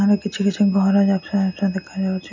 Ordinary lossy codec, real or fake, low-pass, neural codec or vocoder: MP3, 48 kbps; real; 7.2 kHz; none